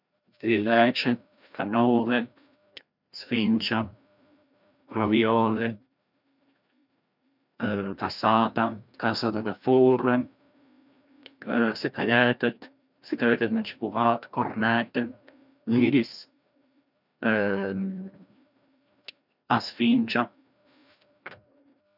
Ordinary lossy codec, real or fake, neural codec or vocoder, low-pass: none; fake; codec, 16 kHz, 1 kbps, FreqCodec, larger model; 5.4 kHz